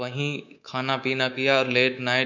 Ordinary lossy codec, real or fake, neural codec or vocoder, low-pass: none; fake; codec, 24 kHz, 3.1 kbps, DualCodec; 7.2 kHz